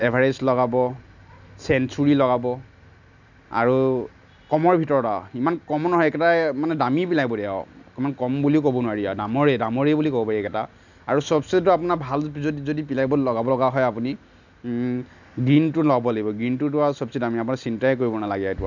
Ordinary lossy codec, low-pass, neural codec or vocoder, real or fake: none; 7.2 kHz; none; real